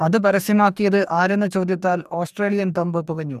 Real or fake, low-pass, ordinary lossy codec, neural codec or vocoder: fake; 14.4 kHz; none; codec, 44.1 kHz, 2.6 kbps, DAC